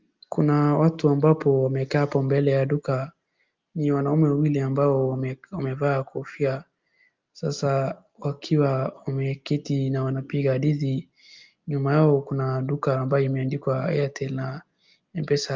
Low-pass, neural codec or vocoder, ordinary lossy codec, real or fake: 7.2 kHz; none; Opus, 24 kbps; real